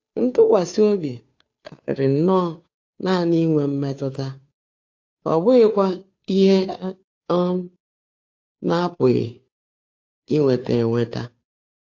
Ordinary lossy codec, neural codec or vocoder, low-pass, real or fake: AAC, 48 kbps; codec, 16 kHz, 2 kbps, FunCodec, trained on Chinese and English, 25 frames a second; 7.2 kHz; fake